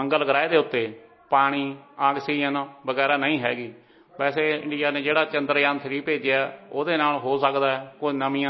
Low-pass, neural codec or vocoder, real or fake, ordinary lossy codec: 7.2 kHz; none; real; MP3, 24 kbps